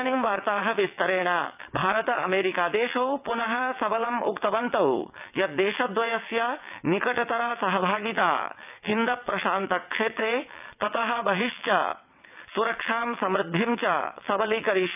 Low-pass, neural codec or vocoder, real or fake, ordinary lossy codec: 3.6 kHz; vocoder, 22.05 kHz, 80 mel bands, WaveNeXt; fake; none